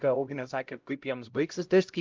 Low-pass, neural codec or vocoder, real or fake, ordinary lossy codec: 7.2 kHz; codec, 16 kHz, 0.5 kbps, X-Codec, HuBERT features, trained on LibriSpeech; fake; Opus, 24 kbps